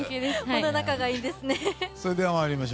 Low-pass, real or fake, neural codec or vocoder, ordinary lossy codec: none; real; none; none